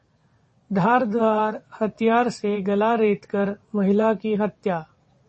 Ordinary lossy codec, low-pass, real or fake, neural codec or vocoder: MP3, 32 kbps; 9.9 kHz; fake; vocoder, 22.05 kHz, 80 mel bands, WaveNeXt